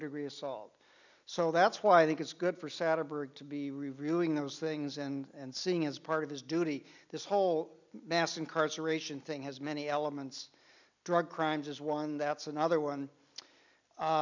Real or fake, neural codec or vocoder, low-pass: real; none; 7.2 kHz